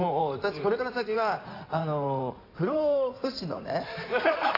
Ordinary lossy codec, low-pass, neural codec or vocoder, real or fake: AAC, 24 kbps; 5.4 kHz; codec, 16 kHz in and 24 kHz out, 2.2 kbps, FireRedTTS-2 codec; fake